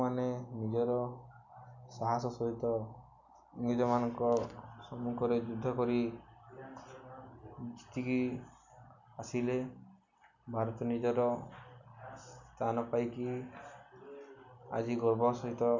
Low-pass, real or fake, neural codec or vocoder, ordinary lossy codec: 7.2 kHz; real; none; none